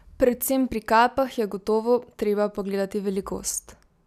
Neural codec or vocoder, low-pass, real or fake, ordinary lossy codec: none; 14.4 kHz; real; none